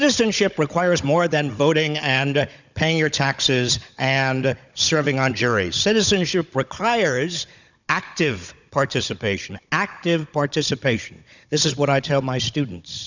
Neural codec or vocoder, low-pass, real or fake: codec, 16 kHz, 16 kbps, FunCodec, trained on Chinese and English, 50 frames a second; 7.2 kHz; fake